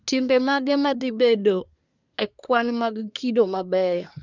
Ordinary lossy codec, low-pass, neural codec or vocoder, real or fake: none; 7.2 kHz; codec, 24 kHz, 1 kbps, SNAC; fake